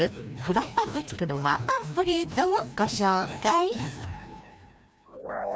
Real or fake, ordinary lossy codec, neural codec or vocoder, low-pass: fake; none; codec, 16 kHz, 1 kbps, FreqCodec, larger model; none